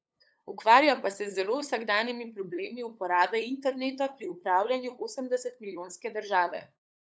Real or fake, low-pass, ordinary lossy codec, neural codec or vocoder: fake; none; none; codec, 16 kHz, 8 kbps, FunCodec, trained on LibriTTS, 25 frames a second